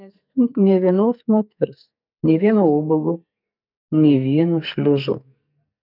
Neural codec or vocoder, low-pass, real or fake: codec, 44.1 kHz, 2.6 kbps, SNAC; 5.4 kHz; fake